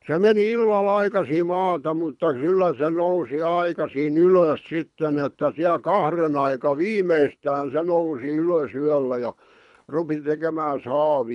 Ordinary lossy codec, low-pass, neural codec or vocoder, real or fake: none; 10.8 kHz; codec, 24 kHz, 3 kbps, HILCodec; fake